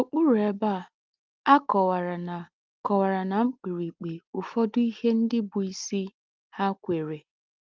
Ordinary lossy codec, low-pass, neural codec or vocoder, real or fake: Opus, 32 kbps; 7.2 kHz; none; real